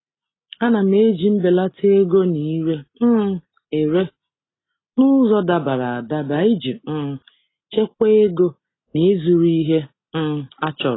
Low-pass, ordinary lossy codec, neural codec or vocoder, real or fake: 7.2 kHz; AAC, 16 kbps; none; real